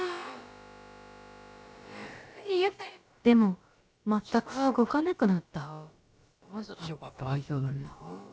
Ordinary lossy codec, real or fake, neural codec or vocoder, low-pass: none; fake; codec, 16 kHz, about 1 kbps, DyCAST, with the encoder's durations; none